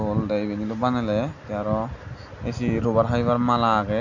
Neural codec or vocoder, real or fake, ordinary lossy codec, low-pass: none; real; none; 7.2 kHz